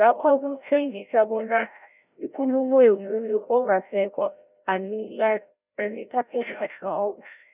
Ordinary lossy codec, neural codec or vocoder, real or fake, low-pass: none; codec, 16 kHz, 0.5 kbps, FreqCodec, larger model; fake; 3.6 kHz